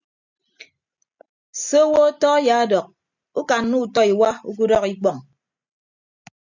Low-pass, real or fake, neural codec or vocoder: 7.2 kHz; real; none